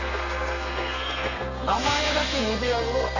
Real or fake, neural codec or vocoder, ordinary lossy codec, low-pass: fake; codec, 32 kHz, 1.9 kbps, SNAC; none; 7.2 kHz